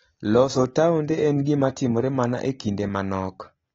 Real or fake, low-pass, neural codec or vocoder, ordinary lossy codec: real; 19.8 kHz; none; AAC, 24 kbps